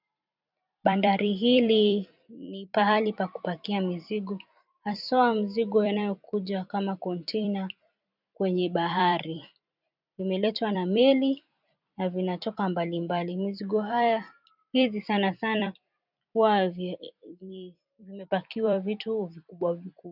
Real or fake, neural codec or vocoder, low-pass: fake; vocoder, 44.1 kHz, 128 mel bands every 256 samples, BigVGAN v2; 5.4 kHz